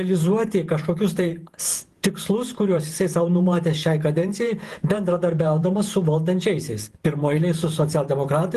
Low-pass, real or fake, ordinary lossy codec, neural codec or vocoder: 14.4 kHz; fake; Opus, 24 kbps; vocoder, 48 kHz, 128 mel bands, Vocos